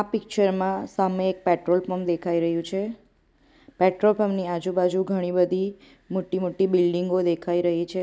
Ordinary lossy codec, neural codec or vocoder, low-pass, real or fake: none; none; none; real